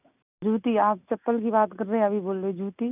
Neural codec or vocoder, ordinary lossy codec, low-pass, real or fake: none; none; 3.6 kHz; real